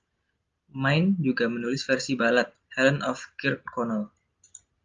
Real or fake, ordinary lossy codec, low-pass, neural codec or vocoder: real; Opus, 32 kbps; 7.2 kHz; none